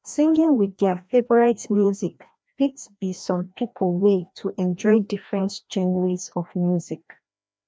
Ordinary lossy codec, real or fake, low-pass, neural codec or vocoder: none; fake; none; codec, 16 kHz, 1 kbps, FreqCodec, larger model